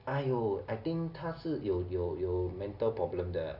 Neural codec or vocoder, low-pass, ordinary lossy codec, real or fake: none; 5.4 kHz; none; real